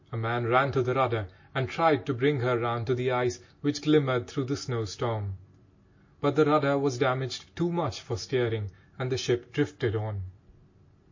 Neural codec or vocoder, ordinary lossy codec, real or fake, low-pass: none; MP3, 32 kbps; real; 7.2 kHz